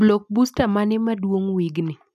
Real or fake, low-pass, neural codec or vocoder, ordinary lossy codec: real; 14.4 kHz; none; none